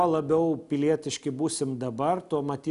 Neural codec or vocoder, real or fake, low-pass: none; real; 10.8 kHz